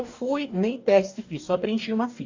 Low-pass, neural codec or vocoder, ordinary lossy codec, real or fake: 7.2 kHz; codec, 44.1 kHz, 2.6 kbps, DAC; AAC, 48 kbps; fake